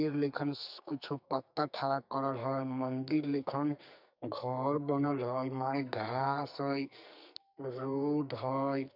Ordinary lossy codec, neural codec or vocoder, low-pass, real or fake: none; codec, 44.1 kHz, 2.6 kbps, SNAC; 5.4 kHz; fake